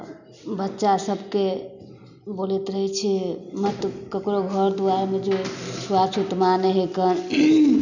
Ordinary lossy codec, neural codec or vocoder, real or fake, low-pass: none; none; real; 7.2 kHz